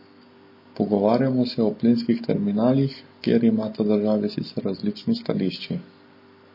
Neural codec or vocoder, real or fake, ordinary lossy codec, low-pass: none; real; MP3, 24 kbps; 5.4 kHz